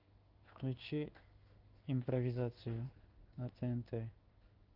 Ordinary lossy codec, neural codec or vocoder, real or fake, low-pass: Opus, 32 kbps; codec, 16 kHz in and 24 kHz out, 1 kbps, XY-Tokenizer; fake; 5.4 kHz